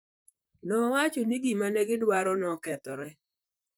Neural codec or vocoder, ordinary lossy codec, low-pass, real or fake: vocoder, 44.1 kHz, 128 mel bands, Pupu-Vocoder; none; none; fake